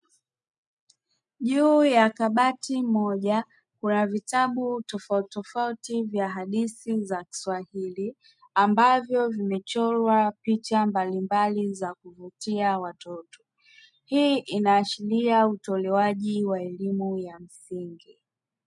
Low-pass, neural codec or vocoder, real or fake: 10.8 kHz; none; real